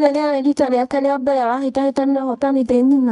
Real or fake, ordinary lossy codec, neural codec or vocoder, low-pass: fake; none; codec, 24 kHz, 0.9 kbps, WavTokenizer, medium music audio release; 10.8 kHz